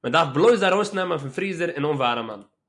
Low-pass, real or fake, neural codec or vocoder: 10.8 kHz; real; none